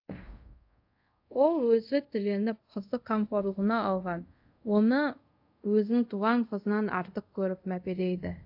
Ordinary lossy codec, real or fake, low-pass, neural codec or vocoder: AAC, 48 kbps; fake; 5.4 kHz; codec, 24 kHz, 0.5 kbps, DualCodec